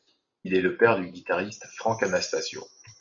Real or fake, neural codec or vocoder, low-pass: real; none; 7.2 kHz